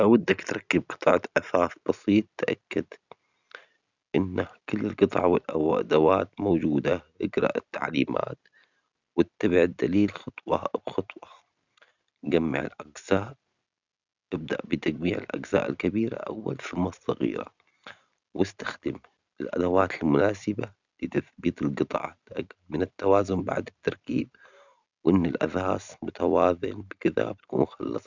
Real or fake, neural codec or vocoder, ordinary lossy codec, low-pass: real; none; none; 7.2 kHz